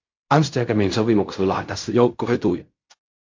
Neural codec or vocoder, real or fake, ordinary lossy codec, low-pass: codec, 16 kHz in and 24 kHz out, 0.4 kbps, LongCat-Audio-Codec, fine tuned four codebook decoder; fake; MP3, 48 kbps; 7.2 kHz